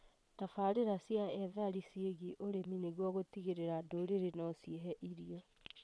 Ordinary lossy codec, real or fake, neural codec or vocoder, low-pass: none; fake; vocoder, 22.05 kHz, 80 mel bands, Vocos; none